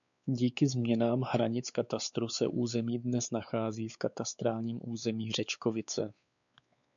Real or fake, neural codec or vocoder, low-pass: fake; codec, 16 kHz, 4 kbps, X-Codec, WavLM features, trained on Multilingual LibriSpeech; 7.2 kHz